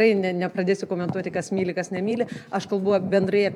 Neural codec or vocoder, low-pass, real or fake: vocoder, 44.1 kHz, 128 mel bands every 512 samples, BigVGAN v2; 19.8 kHz; fake